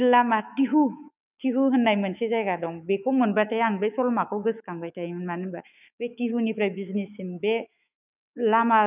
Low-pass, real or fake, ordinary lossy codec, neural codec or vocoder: 3.6 kHz; fake; none; autoencoder, 48 kHz, 128 numbers a frame, DAC-VAE, trained on Japanese speech